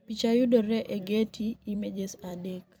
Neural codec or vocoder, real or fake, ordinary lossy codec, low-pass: vocoder, 44.1 kHz, 128 mel bands every 256 samples, BigVGAN v2; fake; none; none